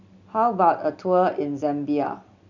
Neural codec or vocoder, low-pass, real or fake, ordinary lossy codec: none; 7.2 kHz; real; none